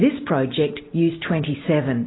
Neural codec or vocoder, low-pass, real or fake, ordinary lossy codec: none; 7.2 kHz; real; AAC, 16 kbps